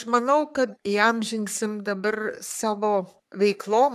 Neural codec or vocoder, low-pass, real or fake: codec, 44.1 kHz, 3.4 kbps, Pupu-Codec; 14.4 kHz; fake